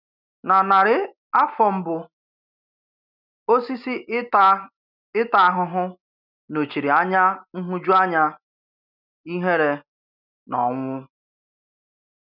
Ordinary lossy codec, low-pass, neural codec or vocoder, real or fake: none; 5.4 kHz; none; real